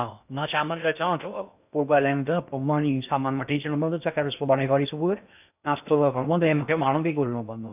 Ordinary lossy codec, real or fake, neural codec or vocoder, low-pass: none; fake; codec, 16 kHz in and 24 kHz out, 0.6 kbps, FocalCodec, streaming, 2048 codes; 3.6 kHz